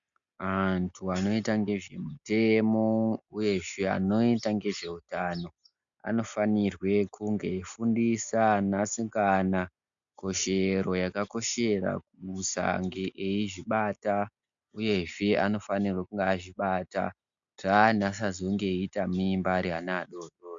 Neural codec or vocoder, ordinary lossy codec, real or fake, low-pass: none; MP3, 64 kbps; real; 7.2 kHz